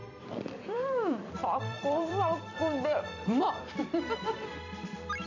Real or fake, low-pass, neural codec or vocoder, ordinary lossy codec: fake; 7.2 kHz; vocoder, 44.1 kHz, 128 mel bands every 256 samples, BigVGAN v2; none